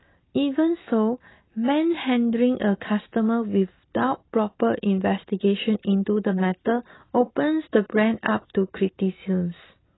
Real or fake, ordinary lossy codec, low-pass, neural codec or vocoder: real; AAC, 16 kbps; 7.2 kHz; none